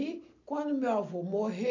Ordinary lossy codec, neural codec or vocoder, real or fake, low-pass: none; none; real; 7.2 kHz